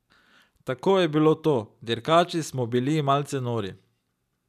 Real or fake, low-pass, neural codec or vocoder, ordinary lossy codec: real; 14.4 kHz; none; none